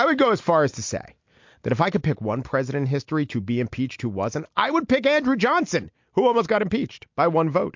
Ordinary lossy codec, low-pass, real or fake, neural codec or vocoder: MP3, 48 kbps; 7.2 kHz; real; none